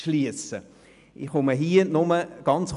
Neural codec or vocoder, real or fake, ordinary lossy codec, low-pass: none; real; MP3, 96 kbps; 10.8 kHz